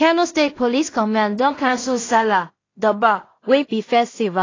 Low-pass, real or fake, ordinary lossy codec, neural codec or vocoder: 7.2 kHz; fake; AAC, 32 kbps; codec, 16 kHz in and 24 kHz out, 0.4 kbps, LongCat-Audio-Codec, two codebook decoder